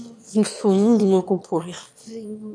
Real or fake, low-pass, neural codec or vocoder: fake; 9.9 kHz; autoencoder, 22.05 kHz, a latent of 192 numbers a frame, VITS, trained on one speaker